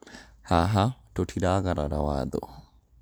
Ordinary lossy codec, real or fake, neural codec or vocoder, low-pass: none; real; none; none